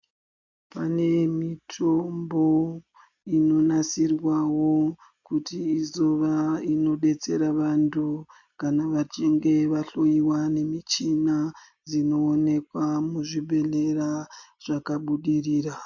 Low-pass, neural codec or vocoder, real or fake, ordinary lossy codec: 7.2 kHz; none; real; MP3, 48 kbps